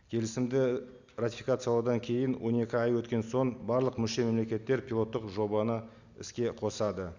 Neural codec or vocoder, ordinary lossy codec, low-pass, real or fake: none; Opus, 64 kbps; 7.2 kHz; real